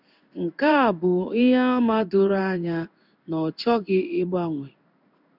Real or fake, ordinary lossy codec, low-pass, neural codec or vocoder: fake; none; 5.4 kHz; codec, 16 kHz in and 24 kHz out, 1 kbps, XY-Tokenizer